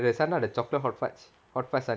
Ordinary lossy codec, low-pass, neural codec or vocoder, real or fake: none; none; none; real